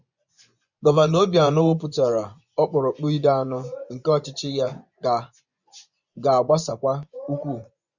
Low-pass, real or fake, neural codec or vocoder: 7.2 kHz; fake; vocoder, 24 kHz, 100 mel bands, Vocos